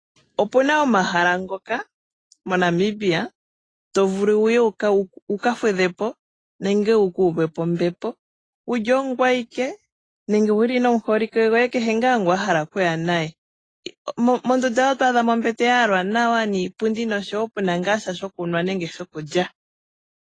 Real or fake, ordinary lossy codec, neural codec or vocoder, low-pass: real; AAC, 32 kbps; none; 9.9 kHz